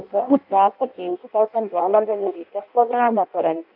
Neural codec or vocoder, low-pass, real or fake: codec, 16 kHz in and 24 kHz out, 0.6 kbps, FireRedTTS-2 codec; 5.4 kHz; fake